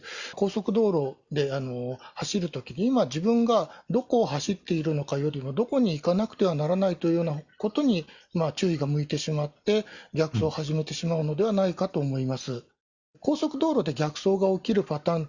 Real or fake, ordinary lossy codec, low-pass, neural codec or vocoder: real; none; 7.2 kHz; none